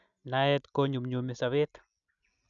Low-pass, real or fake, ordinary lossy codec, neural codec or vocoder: 7.2 kHz; real; none; none